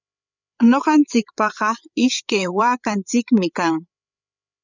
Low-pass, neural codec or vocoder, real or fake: 7.2 kHz; codec, 16 kHz, 8 kbps, FreqCodec, larger model; fake